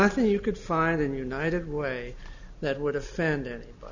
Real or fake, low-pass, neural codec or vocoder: real; 7.2 kHz; none